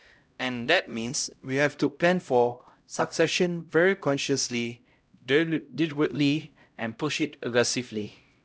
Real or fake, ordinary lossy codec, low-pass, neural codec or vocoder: fake; none; none; codec, 16 kHz, 0.5 kbps, X-Codec, HuBERT features, trained on LibriSpeech